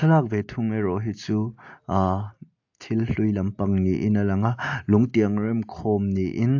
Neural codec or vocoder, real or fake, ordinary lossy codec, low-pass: none; real; AAC, 48 kbps; 7.2 kHz